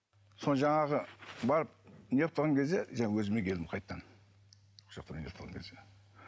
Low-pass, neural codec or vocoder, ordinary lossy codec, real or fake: none; none; none; real